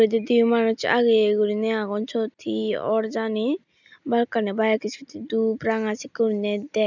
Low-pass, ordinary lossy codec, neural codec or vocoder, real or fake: 7.2 kHz; none; none; real